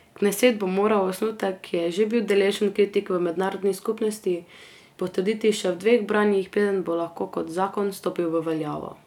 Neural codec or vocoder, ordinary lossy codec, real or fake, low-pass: none; none; real; 19.8 kHz